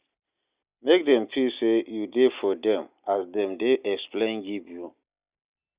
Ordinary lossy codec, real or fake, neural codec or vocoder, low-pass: Opus, 64 kbps; real; none; 3.6 kHz